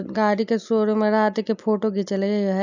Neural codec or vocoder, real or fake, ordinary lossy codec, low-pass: none; real; none; 7.2 kHz